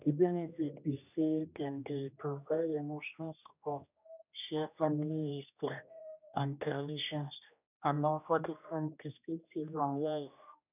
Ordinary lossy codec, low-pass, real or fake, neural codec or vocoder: none; 3.6 kHz; fake; codec, 16 kHz, 1 kbps, X-Codec, HuBERT features, trained on general audio